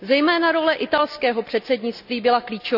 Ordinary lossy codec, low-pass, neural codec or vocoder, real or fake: none; 5.4 kHz; none; real